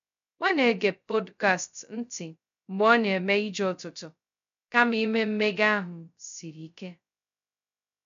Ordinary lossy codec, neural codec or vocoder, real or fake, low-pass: MP3, 64 kbps; codec, 16 kHz, 0.2 kbps, FocalCodec; fake; 7.2 kHz